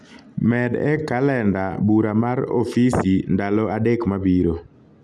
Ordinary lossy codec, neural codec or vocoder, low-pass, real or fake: none; none; none; real